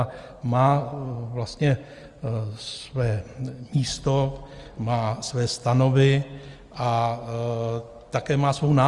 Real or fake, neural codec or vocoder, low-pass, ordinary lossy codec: real; none; 10.8 kHz; Opus, 32 kbps